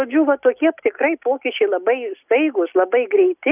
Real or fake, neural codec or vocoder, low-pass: real; none; 3.6 kHz